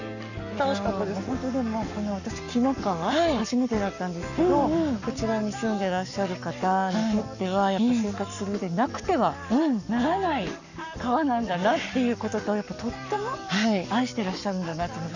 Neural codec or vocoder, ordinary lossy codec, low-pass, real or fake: codec, 44.1 kHz, 7.8 kbps, Pupu-Codec; none; 7.2 kHz; fake